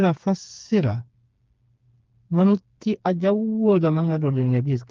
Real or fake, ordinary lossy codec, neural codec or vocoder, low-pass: fake; Opus, 32 kbps; codec, 16 kHz, 4 kbps, FreqCodec, smaller model; 7.2 kHz